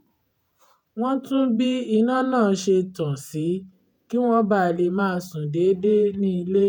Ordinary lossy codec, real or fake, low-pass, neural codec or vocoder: none; fake; 19.8 kHz; vocoder, 48 kHz, 128 mel bands, Vocos